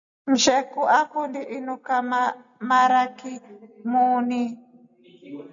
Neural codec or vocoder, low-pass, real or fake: none; 7.2 kHz; real